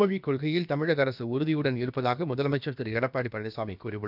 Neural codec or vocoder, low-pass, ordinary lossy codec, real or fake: codec, 16 kHz, about 1 kbps, DyCAST, with the encoder's durations; 5.4 kHz; none; fake